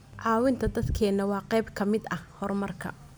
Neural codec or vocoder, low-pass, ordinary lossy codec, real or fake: none; none; none; real